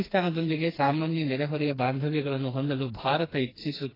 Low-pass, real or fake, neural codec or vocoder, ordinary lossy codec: 5.4 kHz; fake; codec, 16 kHz, 2 kbps, FreqCodec, smaller model; AAC, 24 kbps